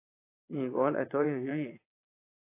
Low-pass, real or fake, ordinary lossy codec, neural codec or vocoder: 3.6 kHz; fake; AAC, 24 kbps; vocoder, 22.05 kHz, 80 mel bands, Vocos